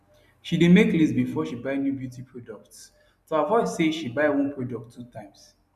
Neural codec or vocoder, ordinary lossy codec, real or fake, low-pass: none; none; real; 14.4 kHz